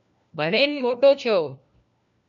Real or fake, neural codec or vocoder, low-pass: fake; codec, 16 kHz, 1 kbps, FunCodec, trained on LibriTTS, 50 frames a second; 7.2 kHz